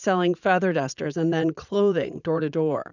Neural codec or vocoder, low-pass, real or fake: vocoder, 44.1 kHz, 80 mel bands, Vocos; 7.2 kHz; fake